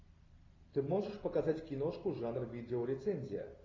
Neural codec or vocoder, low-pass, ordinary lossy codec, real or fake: none; 7.2 kHz; AAC, 32 kbps; real